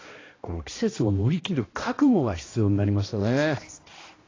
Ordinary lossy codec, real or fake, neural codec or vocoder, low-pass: AAC, 32 kbps; fake; codec, 16 kHz, 1 kbps, X-Codec, HuBERT features, trained on balanced general audio; 7.2 kHz